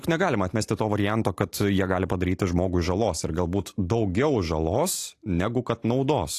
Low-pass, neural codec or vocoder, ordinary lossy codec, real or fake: 14.4 kHz; none; AAC, 48 kbps; real